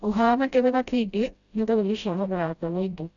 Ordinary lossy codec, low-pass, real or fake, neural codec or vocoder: none; 7.2 kHz; fake; codec, 16 kHz, 0.5 kbps, FreqCodec, smaller model